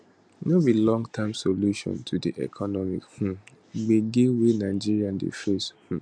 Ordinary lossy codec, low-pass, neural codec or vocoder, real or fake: none; 9.9 kHz; none; real